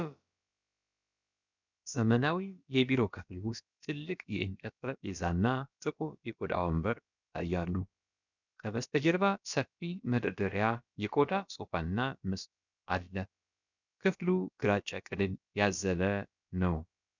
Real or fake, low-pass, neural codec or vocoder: fake; 7.2 kHz; codec, 16 kHz, about 1 kbps, DyCAST, with the encoder's durations